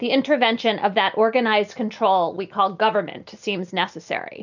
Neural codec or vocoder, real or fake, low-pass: none; real; 7.2 kHz